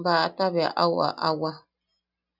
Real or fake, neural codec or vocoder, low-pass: real; none; 5.4 kHz